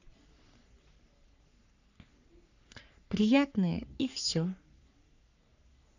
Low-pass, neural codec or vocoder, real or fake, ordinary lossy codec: 7.2 kHz; codec, 44.1 kHz, 3.4 kbps, Pupu-Codec; fake; none